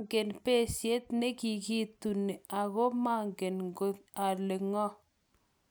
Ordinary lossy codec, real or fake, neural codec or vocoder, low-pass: none; real; none; none